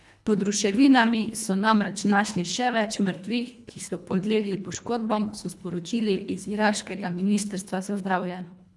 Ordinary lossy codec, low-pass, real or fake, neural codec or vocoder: none; none; fake; codec, 24 kHz, 1.5 kbps, HILCodec